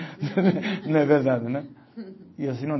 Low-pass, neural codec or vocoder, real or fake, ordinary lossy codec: 7.2 kHz; none; real; MP3, 24 kbps